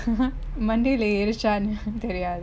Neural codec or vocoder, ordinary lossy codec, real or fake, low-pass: none; none; real; none